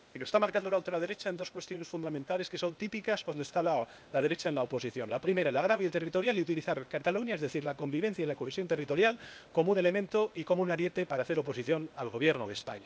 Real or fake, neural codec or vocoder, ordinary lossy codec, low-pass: fake; codec, 16 kHz, 0.8 kbps, ZipCodec; none; none